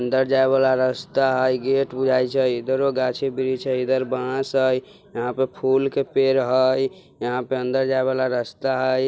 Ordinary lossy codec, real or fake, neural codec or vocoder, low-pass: none; real; none; none